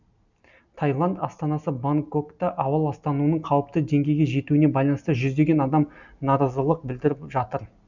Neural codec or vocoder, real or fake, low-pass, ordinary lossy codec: none; real; 7.2 kHz; none